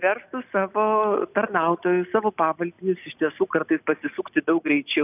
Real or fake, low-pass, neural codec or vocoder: real; 3.6 kHz; none